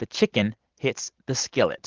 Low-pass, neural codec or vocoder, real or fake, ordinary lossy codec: 7.2 kHz; none; real; Opus, 16 kbps